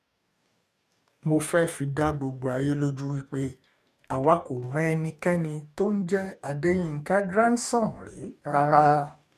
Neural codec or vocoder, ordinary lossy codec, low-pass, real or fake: codec, 44.1 kHz, 2.6 kbps, DAC; none; 14.4 kHz; fake